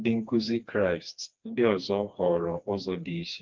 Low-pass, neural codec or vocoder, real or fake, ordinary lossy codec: 7.2 kHz; codec, 16 kHz, 2 kbps, FreqCodec, smaller model; fake; Opus, 32 kbps